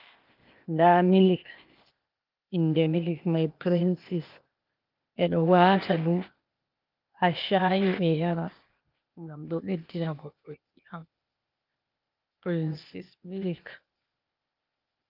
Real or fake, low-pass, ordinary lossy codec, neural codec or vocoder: fake; 5.4 kHz; Opus, 24 kbps; codec, 16 kHz, 0.8 kbps, ZipCodec